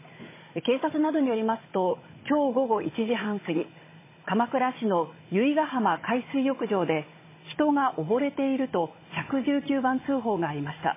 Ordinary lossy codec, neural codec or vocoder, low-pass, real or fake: MP3, 16 kbps; codec, 16 kHz, 16 kbps, FunCodec, trained on Chinese and English, 50 frames a second; 3.6 kHz; fake